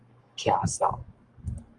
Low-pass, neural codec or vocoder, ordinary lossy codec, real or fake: 10.8 kHz; codec, 44.1 kHz, 7.8 kbps, Pupu-Codec; Opus, 32 kbps; fake